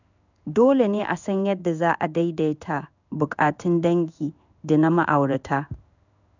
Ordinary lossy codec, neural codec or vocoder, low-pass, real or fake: none; codec, 16 kHz in and 24 kHz out, 1 kbps, XY-Tokenizer; 7.2 kHz; fake